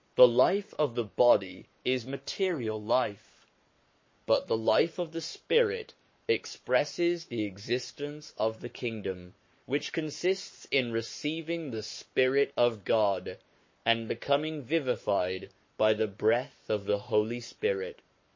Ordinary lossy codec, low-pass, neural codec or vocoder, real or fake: MP3, 32 kbps; 7.2 kHz; codec, 44.1 kHz, 7.8 kbps, Pupu-Codec; fake